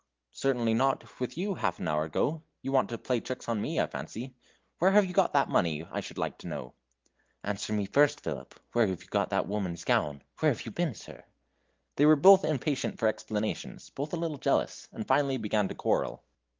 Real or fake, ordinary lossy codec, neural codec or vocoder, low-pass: real; Opus, 32 kbps; none; 7.2 kHz